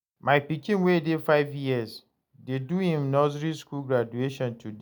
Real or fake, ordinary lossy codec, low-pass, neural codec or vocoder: real; none; none; none